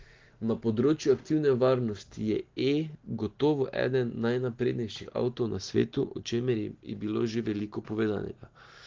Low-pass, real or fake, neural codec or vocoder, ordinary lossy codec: 7.2 kHz; real; none; Opus, 16 kbps